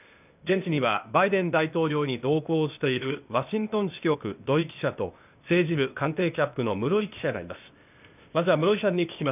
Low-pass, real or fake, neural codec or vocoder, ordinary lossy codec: 3.6 kHz; fake; codec, 16 kHz, 0.8 kbps, ZipCodec; none